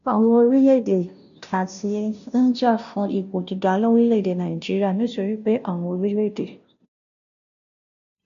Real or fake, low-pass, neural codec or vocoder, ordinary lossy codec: fake; 7.2 kHz; codec, 16 kHz, 0.5 kbps, FunCodec, trained on Chinese and English, 25 frames a second; none